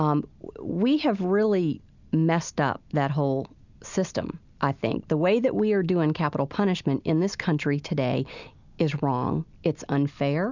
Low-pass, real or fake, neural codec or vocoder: 7.2 kHz; real; none